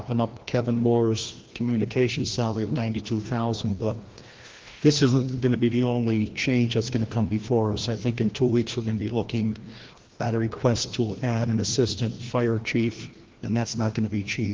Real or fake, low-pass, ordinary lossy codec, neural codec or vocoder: fake; 7.2 kHz; Opus, 16 kbps; codec, 16 kHz, 1 kbps, FreqCodec, larger model